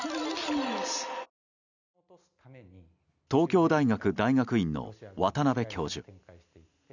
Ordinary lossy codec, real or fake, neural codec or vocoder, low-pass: none; real; none; 7.2 kHz